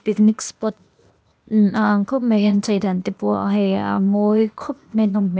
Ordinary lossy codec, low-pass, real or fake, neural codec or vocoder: none; none; fake; codec, 16 kHz, 0.8 kbps, ZipCodec